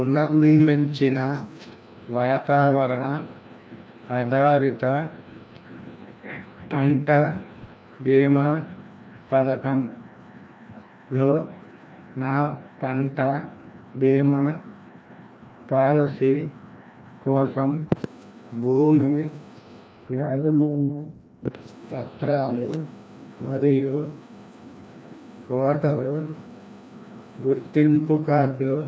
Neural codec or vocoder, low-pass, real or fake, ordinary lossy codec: codec, 16 kHz, 1 kbps, FreqCodec, larger model; none; fake; none